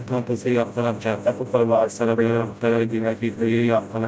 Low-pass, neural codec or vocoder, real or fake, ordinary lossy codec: none; codec, 16 kHz, 0.5 kbps, FreqCodec, smaller model; fake; none